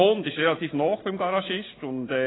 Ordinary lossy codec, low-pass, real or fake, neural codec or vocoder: AAC, 16 kbps; 7.2 kHz; real; none